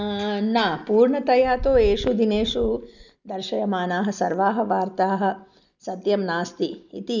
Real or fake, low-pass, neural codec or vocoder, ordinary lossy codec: real; 7.2 kHz; none; none